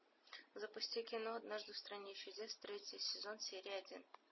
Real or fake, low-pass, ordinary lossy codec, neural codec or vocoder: real; 7.2 kHz; MP3, 24 kbps; none